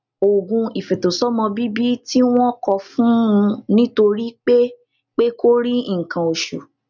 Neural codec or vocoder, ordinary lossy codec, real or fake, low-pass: none; none; real; 7.2 kHz